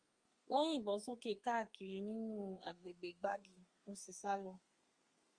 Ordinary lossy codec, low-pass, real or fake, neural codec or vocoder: Opus, 32 kbps; 9.9 kHz; fake; codec, 32 kHz, 1.9 kbps, SNAC